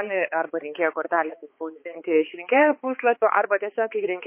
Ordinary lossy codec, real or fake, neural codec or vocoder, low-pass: MP3, 24 kbps; fake; codec, 16 kHz, 4 kbps, X-Codec, HuBERT features, trained on balanced general audio; 3.6 kHz